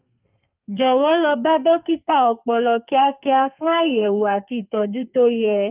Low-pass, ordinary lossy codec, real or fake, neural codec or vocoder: 3.6 kHz; Opus, 32 kbps; fake; codec, 32 kHz, 1.9 kbps, SNAC